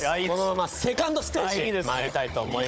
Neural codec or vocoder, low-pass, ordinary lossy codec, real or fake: codec, 16 kHz, 16 kbps, FunCodec, trained on Chinese and English, 50 frames a second; none; none; fake